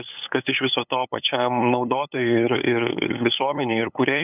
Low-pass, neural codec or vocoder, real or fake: 3.6 kHz; codec, 16 kHz, 8 kbps, FunCodec, trained on LibriTTS, 25 frames a second; fake